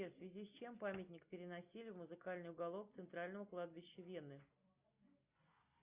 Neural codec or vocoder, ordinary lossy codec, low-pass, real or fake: none; Opus, 64 kbps; 3.6 kHz; real